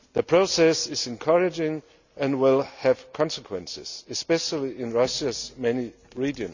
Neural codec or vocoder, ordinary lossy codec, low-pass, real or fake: none; none; 7.2 kHz; real